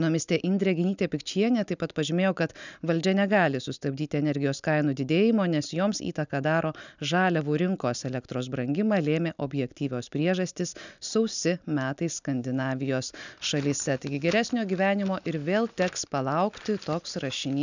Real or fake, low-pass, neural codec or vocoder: real; 7.2 kHz; none